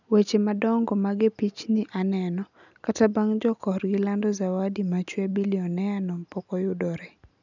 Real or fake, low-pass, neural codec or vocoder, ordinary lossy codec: real; 7.2 kHz; none; none